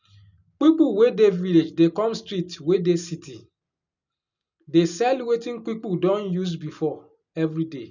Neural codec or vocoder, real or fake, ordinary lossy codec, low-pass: none; real; none; 7.2 kHz